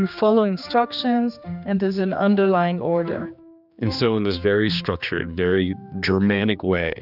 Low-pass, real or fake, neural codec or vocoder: 5.4 kHz; fake; codec, 16 kHz, 2 kbps, X-Codec, HuBERT features, trained on general audio